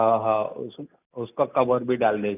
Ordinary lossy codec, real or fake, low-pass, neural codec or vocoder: none; real; 3.6 kHz; none